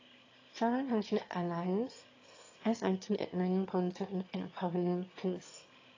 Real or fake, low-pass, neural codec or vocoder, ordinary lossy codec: fake; 7.2 kHz; autoencoder, 22.05 kHz, a latent of 192 numbers a frame, VITS, trained on one speaker; AAC, 32 kbps